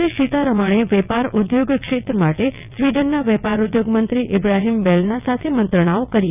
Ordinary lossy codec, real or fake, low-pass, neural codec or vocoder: none; fake; 3.6 kHz; vocoder, 22.05 kHz, 80 mel bands, WaveNeXt